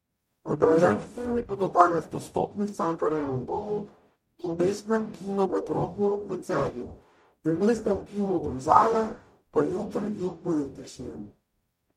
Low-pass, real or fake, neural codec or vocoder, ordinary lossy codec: 19.8 kHz; fake; codec, 44.1 kHz, 0.9 kbps, DAC; MP3, 64 kbps